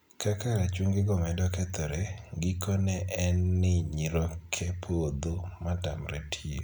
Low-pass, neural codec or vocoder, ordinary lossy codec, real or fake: none; none; none; real